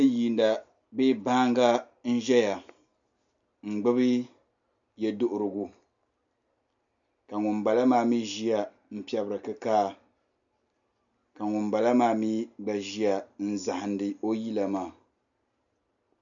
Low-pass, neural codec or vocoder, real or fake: 7.2 kHz; none; real